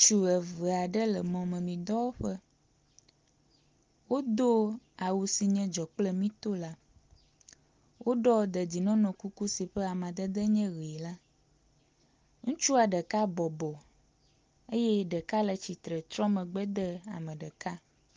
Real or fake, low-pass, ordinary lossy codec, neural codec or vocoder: real; 7.2 kHz; Opus, 32 kbps; none